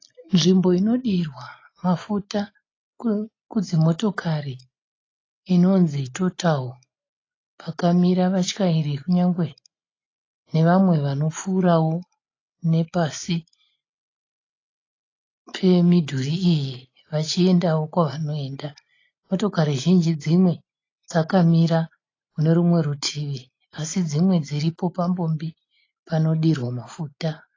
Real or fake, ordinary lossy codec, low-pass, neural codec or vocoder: real; AAC, 32 kbps; 7.2 kHz; none